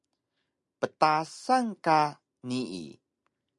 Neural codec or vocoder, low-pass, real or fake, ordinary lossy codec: none; 10.8 kHz; real; MP3, 96 kbps